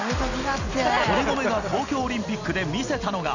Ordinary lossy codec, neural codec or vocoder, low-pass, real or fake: none; none; 7.2 kHz; real